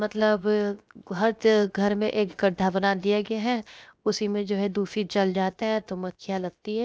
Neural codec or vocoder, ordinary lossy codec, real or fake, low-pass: codec, 16 kHz, 0.7 kbps, FocalCodec; none; fake; none